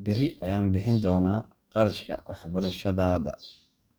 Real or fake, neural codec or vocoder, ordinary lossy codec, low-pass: fake; codec, 44.1 kHz, 2.6 kbps, DAC; none; none